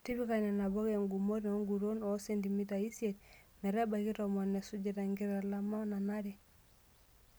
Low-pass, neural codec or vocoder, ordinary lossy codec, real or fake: none; none; none; real